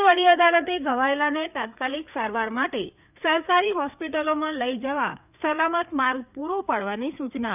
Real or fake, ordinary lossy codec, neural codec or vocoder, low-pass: fake; none; codec, 16 kHz, 4 kbps, FreqCodec, larger model; 3.6 kHz